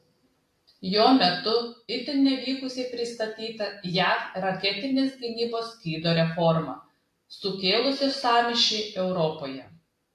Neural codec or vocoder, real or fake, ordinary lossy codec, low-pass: none; real; AAC, 64 kbps; 14.4 kHz